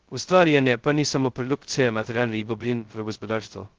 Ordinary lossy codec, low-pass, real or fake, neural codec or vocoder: Opus, 16 kbps; 7.2 kHz; fake; codec, 16 kHz, 0.2 kbps, FocalCodec